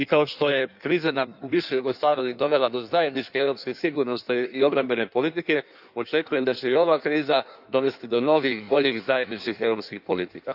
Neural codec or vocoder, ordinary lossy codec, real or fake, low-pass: codec, 16 kHz in and 24 kHz out, 1.1 kbps, FireRedTTS-2 codec; none; fake; 5.4 kHz